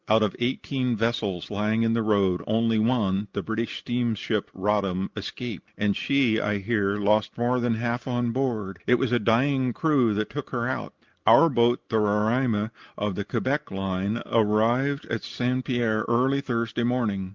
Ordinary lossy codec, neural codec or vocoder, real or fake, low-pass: Opus, 32 kbps; none; real; 7.2 kHz